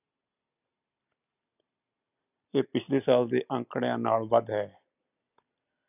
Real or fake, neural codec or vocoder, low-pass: real; none; 3.6 kHz